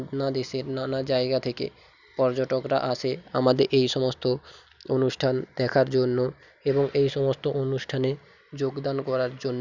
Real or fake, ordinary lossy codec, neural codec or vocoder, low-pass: real; none; none; 7.2 kHz